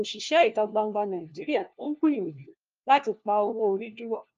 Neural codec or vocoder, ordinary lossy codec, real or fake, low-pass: codec, 16 kHz, 1 kbps, FunCodec, trained on LibriTTS, 50 frames a second; Opus, 24 kbps; fake; 7.2 kHz